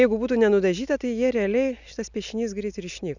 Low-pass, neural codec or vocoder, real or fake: 7.2 kHz; none; real